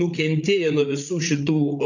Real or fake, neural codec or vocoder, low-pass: fake; codec, 16 kHz, 8 kbps, FreqCodec, larger model; 7.2 kHz